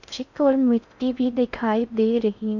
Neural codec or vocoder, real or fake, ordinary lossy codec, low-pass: codec, 16 kHz in and 24 kHz out, 0.6 kbps, FocalCodec, streaming, 4096 codes; fake; none; 7.2 kHz